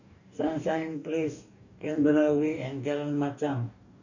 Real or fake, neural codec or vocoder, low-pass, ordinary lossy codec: fake; codec, 44.1 kHz, 2.6 kbps, DAC; 7.2 kHz; none